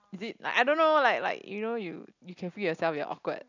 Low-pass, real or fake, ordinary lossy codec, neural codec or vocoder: 7.2 kHz; real; none; none